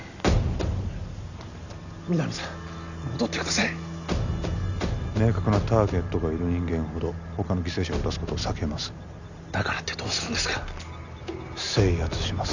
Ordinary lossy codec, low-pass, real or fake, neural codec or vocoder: none; 7.2 kHz; real; none